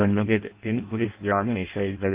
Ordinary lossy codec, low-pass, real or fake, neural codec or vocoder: Opus, 32 kbps; 3.6 kHz; fake; codec, 16 kHz in and 24 kHz out, 0.6 kbps, FireRedTTS-2 codec